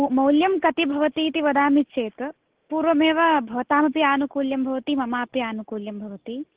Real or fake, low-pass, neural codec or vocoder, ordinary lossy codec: real; 3.6 kHz; none; Opus, 16 kbps